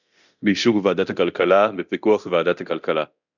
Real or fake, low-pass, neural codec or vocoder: fake; 7.2 kHz; codec, 24 kHz, 0.9 kbps, DualCodec